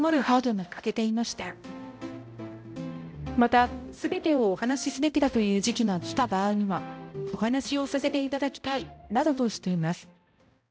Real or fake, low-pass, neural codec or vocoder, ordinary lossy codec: fake; none; codec, 16 kHz, 0.5 kbps, X-Codec, HuBERT features, trained on balanced general audio; none